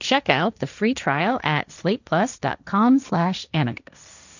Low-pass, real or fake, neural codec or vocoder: 7.2 kHz; fake; codec, 16 kHz, 1.1 kbps, Voila-Tokenizer